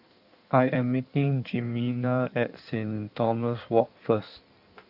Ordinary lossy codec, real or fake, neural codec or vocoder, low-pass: none; fake; codec, 16 kHz in and 24 kHz out, 1.1 kbps, FireRedTTS-2 codec; 5.4 kHz